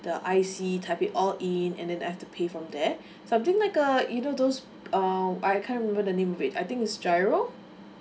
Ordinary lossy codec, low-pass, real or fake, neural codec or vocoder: none; none; real; none